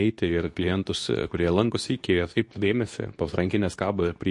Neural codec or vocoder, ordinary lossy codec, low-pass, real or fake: codec, 24 kHz, 0.9 kbps, WavTokenizer, medium speech release version 2; MP3, 48 kbps; 10.8 kHz; fake